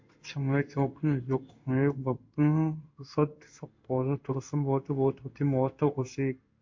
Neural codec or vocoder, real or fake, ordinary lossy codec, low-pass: codec, 16 kHz in and 24 kHz out, 1 kbps, XY-Tokenizer; fake; MP3, 48 kbps; 7.2 kHz